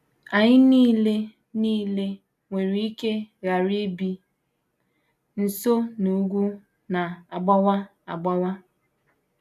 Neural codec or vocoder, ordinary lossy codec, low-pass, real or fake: none; none; 14.4 kHz; real